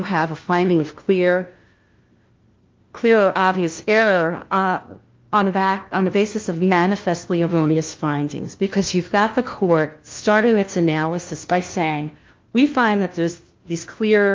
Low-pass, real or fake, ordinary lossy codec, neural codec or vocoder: 7.2 kHz; fake; Opus, 32 kbps; codec, 16 kHz, 1 kbps, FunCodec, trained on LibriTTS, 50 frames a second